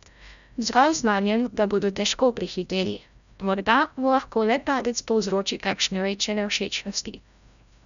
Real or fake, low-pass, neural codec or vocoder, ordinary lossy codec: fake; 7.2 kHz; codec, 16 kHz, 0.5 kbps, FreqCodec, larger model; none